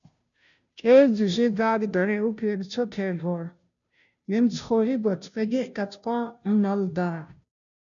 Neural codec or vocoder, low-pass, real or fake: codec, 16 kHz, 0.5 kbps, FunCodec, trained on Chinese and English, 25 frames a second; 7.2 kHz; fake